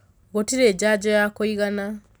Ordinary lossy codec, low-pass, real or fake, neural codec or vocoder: none; none; real; none